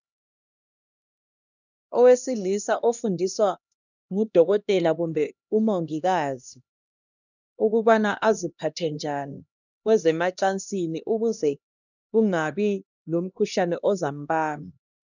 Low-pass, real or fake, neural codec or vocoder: 7.2 kHz; fake; codec, 16 kHz, 1 kbps, X-Codec, HuBERT features, trained on LibriSpeech